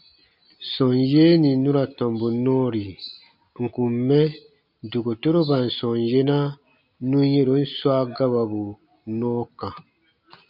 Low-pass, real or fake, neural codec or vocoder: 5.4 kHz; real; none